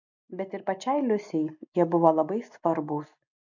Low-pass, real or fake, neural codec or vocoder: 7.2 kHz; real; none